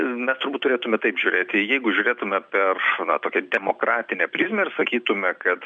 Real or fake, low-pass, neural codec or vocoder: real; 9.9 kHz; none